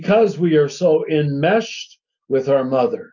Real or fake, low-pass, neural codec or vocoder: real; 7.2 kHz; none